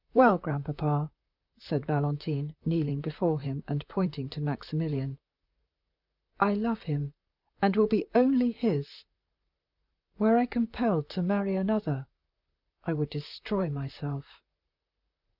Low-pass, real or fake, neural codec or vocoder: 5.4 kHz; fake; vocoder, 44.1 kHz, 128 mel bands, Pupu-Vocoder